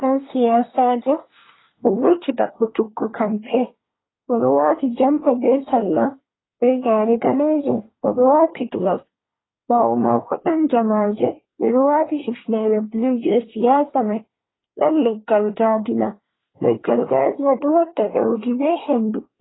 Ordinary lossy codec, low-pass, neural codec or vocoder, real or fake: AAC, 16 kbps; 7.2 kHz; codec, 24 kHz, 1 kbps, SNAC; fake